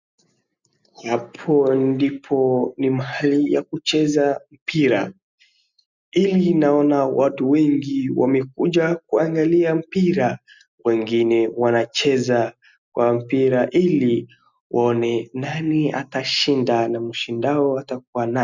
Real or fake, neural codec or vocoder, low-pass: real; none; 7.2 kHz